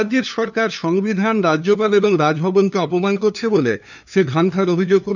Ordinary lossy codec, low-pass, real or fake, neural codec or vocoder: none; 7.2 kHz; fake; codec, 16 kHz, 2 kbps, FunCodec, trained on LibriTTS, 25 frames a second